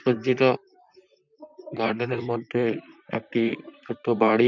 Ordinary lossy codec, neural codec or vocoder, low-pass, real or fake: none; vocoder, 44.1 kHz, 128 mel bands, Pupu-Vocoder; 7.2 kHz; fake